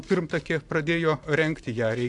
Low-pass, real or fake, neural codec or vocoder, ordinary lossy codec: 10.8 kHz; fake; vocoder, 44.1 kHz, 128 mel bands every 512 samples, BigVGAN v2; AAC, 64 kbps